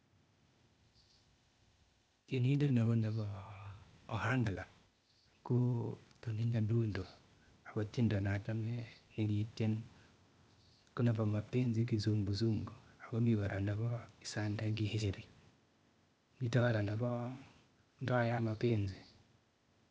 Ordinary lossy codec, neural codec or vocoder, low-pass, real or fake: none; codec, 16 kHz, 0.8 kbps, ZipCodec; none; fake